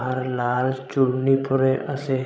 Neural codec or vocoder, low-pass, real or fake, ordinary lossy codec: codec, 16 kHz, 16 kbps, FreqCodec, larger model; none; fake; none